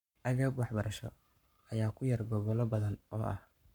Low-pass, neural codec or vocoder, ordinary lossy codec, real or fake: 19.8 kHz; codec, 44.1 kHz, 7.8 kbps, Pupu-Codec; none; fake